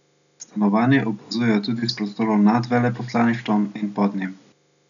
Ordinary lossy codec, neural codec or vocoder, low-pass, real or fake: none; none; 7.2 kHz; real